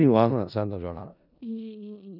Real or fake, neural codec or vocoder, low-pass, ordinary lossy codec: fake; codec, 16 kHz in and 24 kHz out, 0.4 kbps, LongCat-Audio-Codec, four codebook decoder; 5.4 kHz; none